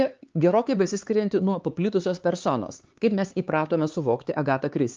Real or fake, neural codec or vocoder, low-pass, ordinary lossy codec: fake; codec, 16 kHz, 4 kbps, X-Codec, HuBERT features, trained on LibriSpeech; 7.2 kHz; Opus, 32 kbps